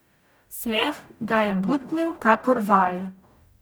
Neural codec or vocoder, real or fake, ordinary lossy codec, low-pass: codec, 44.1 kHz, 0.9 kbps, DAC; fake; none; none